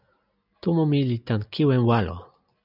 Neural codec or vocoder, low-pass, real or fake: none; 5.4 kHz; real